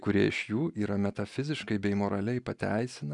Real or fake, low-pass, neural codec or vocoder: real; 10.8 kHz; none